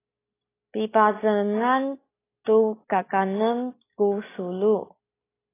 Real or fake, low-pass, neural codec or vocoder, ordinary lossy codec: real; 3.6 kHz; none; AAC, 16 kbps